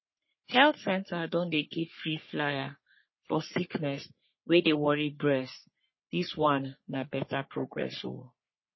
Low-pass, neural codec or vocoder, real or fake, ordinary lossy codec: 7.2 kHz; codec, 44.1 kHz, 3.4 kbps, Pupu-Codec; fake; MP3, 24 kbps